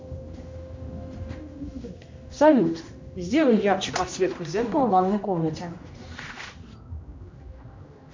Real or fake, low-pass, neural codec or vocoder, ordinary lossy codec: fake; 7.2 kHz; codec, 16 kHz, 1 kbps, X-Codec, HuBERT features, trained on general audio; MP3, 64 kbps